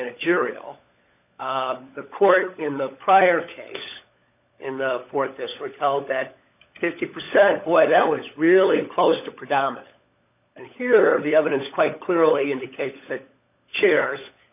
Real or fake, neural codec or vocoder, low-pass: fake; codec, 16 kHz, 16 kbps, FunCodec, trained on LibriTTS, 50 frames a second; 3.6 kHz